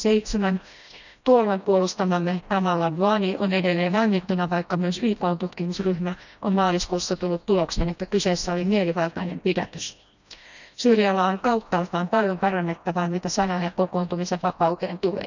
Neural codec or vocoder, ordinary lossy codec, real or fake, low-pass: codec, 16 kHz, 1 kbps, FreqCodec, smaller model; none; fake; 7.2 kHz